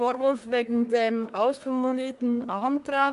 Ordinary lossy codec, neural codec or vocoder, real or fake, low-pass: none; codec, 24 kHz, 1 kbps, SNAC; fake; 10.8 kHz